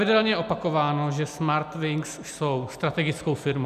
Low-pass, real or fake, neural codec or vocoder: 14.4 kHz; real; none